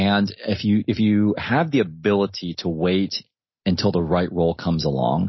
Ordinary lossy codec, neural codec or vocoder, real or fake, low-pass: MP3, 24 kbps; none; real; 7.2 kHz